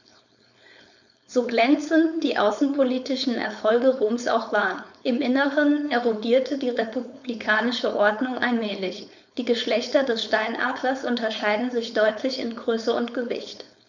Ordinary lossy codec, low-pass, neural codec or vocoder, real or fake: none; 7.2 kHz; codec, 16 kHz, 4.8 kbps, FACodec; fake